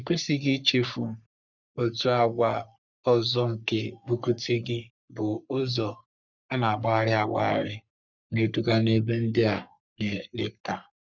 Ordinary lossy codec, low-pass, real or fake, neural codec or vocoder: none; 7.2 kHz; fake; codec, 44.1 kHz, 3.4 kbps, Pupu-Codec